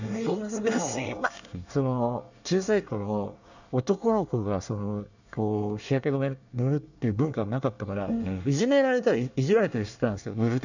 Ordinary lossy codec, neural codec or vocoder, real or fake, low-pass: none; codec, 24 kHz, 1 kbps, SNAC; fake; 7.2 kHz